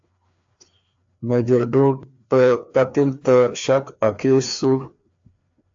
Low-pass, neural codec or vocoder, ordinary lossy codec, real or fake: 7.2 kHz; codec, 16 kHz, 2 kbps, FreqCodec, larger model; AAC, 64 kbps; fake